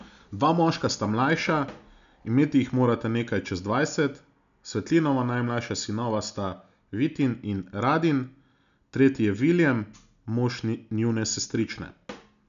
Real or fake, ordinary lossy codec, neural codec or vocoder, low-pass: real; none; none; 7.2 kHz